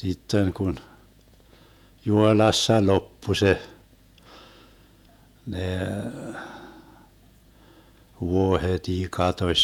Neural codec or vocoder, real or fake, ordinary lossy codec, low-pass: vocoder, 48 kHz, 128 mel bands, Vocos; fake; none; 19.8 kHz